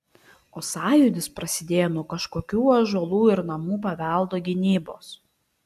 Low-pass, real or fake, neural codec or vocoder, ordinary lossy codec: 14.4 kHz; real; none; AAC, 96 kbps